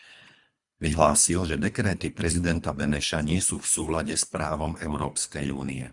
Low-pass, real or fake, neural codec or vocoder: 10.8 kHz; fake; codec, 24 kHz, 3 kbps, HILCodec